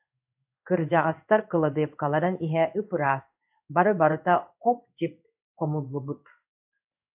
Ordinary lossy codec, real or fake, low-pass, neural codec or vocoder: AAC, 32 kbps; fake; 3.6 kHz; codec, 16 kHz in and 24 kHz out, 1 kbps, XY-Tokenizer